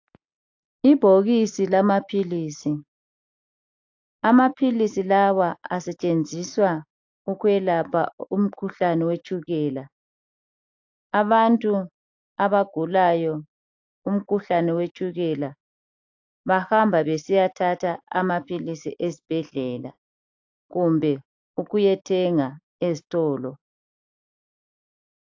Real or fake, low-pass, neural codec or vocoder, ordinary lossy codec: real; 7.2 kHz; none; AAC, 48 kbps